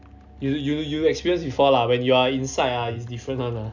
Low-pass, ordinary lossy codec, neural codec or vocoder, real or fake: 7.2 kHz; none; none; real